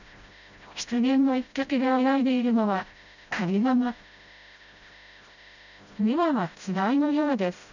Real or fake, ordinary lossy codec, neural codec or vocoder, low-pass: fake; none; codec, 16 kHz, 0.5 kbps, FreqCodec, smaller model; 7.2 kHz